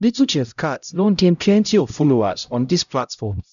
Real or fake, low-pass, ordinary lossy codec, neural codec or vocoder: fake; 7.2 kHz; none; codec, 16 kHz, 0.5 kbps, X-Codec, HuBERT features, trained on LibriSpeech